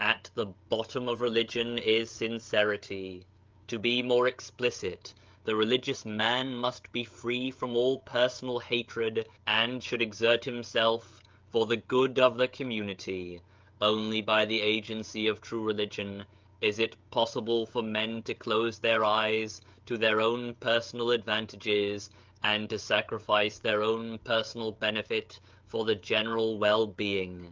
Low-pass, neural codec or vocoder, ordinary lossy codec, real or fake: 7.2 kHz; codec, 16 kHz, 16 kbps, FreqCodec, smaller model; Opus, 24 kbps; fake